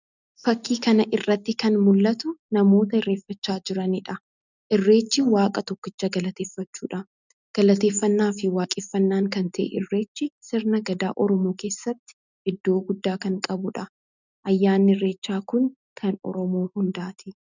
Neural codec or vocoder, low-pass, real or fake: none; 7.2 kHz; real